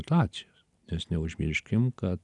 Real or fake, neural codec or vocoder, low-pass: real; none; 10.8 kHz